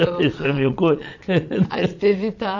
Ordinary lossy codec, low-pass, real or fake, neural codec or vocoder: none; 7.2 kHz; fake; vocoder, 22.05 kHz, 80 mel bands, Vocos